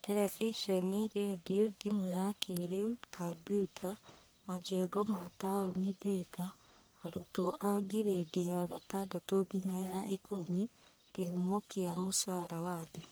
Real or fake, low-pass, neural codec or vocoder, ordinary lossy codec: fake; none; codec, 44.1 kHz, 1.7 kbps, Pupu-Codec; none